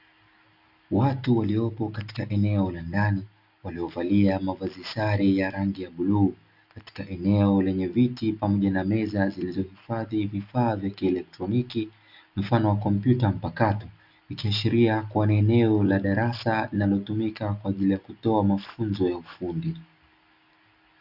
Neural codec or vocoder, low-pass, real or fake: none; 5.4 kHz; real